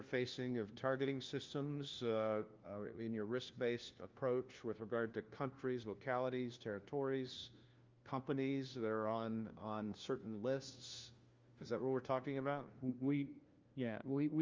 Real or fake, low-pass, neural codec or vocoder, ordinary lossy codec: fake; 7.2 kHz; codec, 16 kHz, 1 kbps, FunCodec, trained on LibriTTS, 50 frames a second; Opus, 32 kbps